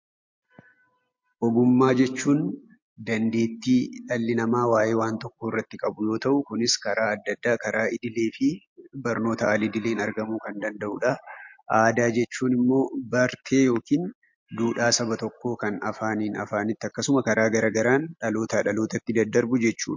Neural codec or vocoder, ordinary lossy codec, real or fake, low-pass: none; MP3, 48 kbps; real; 7.2 kHz